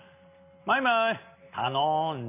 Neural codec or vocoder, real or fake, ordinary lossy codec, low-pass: none; real; none; 3.6 kHz